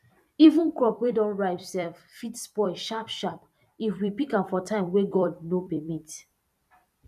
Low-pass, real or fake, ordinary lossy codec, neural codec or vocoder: 14.4 kHz; fake; none; vocoder, 44.1 kHz, 128 mel bands every 256 samples, BigVGAN v2